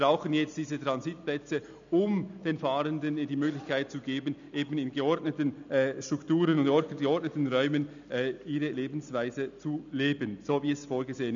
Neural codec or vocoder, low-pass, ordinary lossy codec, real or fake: none; 7.2 kHz; none; real